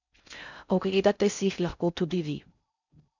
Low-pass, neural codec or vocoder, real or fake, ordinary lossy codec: 7.2 kHz; codec, 16 kHz in and 24 kHz out, 0.6 kbps, FocalCodec, streaming, 4096 codes; fake; none